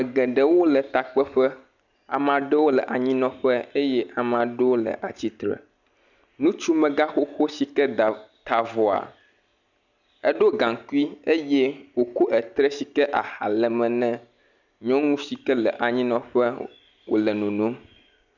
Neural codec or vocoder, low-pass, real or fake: none; 7.2 kHz; real